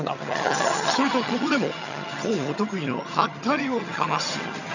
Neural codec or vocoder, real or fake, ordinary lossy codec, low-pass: vocoder, 22.05 kHz, 80 mel bands, HiFi-GAN; fake; none; 7.2 kHz